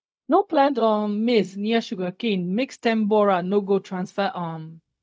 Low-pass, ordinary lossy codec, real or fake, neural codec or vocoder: none; none; fake; codec, 16 kHz, 0.4 kbps, LongCat-Audio-Codec